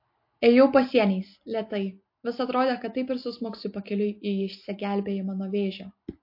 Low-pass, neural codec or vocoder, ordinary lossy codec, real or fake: 5.4 kHz; none; MP3, 32 kbps; real